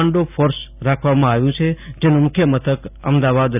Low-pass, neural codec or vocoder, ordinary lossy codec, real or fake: 3.6 kHz; none; none; real